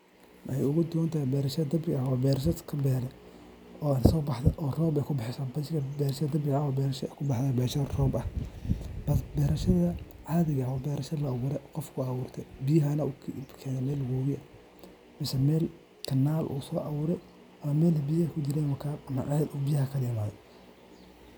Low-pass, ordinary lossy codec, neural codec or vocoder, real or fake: none; none; none; real